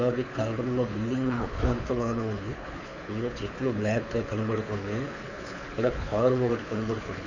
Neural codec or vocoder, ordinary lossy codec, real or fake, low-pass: codec, 24 kHz, 6 kbps, HILCodec; none; fake; 7.2 kHz